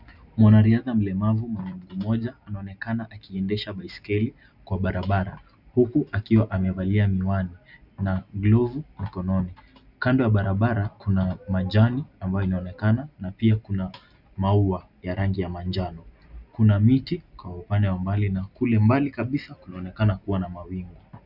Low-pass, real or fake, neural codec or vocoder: 5.4 kHz; real; none